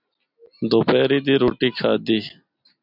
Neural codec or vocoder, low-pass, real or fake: none; 5.4 kHz; real